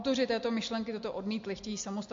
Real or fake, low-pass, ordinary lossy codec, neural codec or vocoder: real; 7.2 kHz; MP3, 48 kbps; none